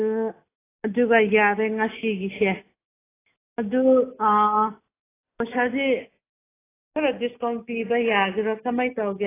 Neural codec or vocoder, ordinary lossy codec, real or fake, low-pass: none; AAC, 16 kbps; real; 3.6 kHz